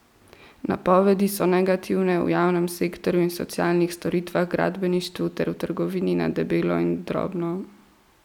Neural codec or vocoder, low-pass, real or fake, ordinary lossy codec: none; 19.8 kHz; real; none